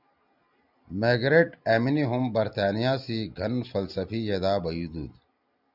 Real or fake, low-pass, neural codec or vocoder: real; 5.4 kHz; none